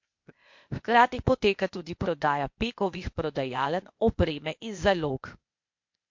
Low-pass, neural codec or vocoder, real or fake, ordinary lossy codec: 7.2 kHz; codec, 16 kHz, 0.8 kbps, ZipCodec; fake; MP3, 48 kbps